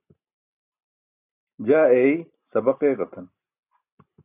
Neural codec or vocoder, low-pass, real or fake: none; 3.6 kHz; real